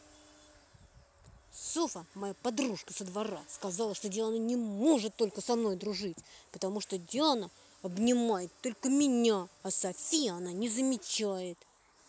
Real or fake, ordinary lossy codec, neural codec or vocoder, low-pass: real; none; none; none